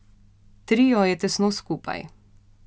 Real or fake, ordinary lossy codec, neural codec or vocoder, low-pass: real; none; none; none